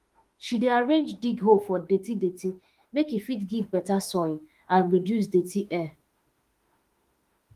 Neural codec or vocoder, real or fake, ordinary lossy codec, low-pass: autoencoder, 48 kHz, 32 numbers a frame, DAC-VAE, trained on Japanese speech; fake; Opus, 24 kbps; 14.4 kHz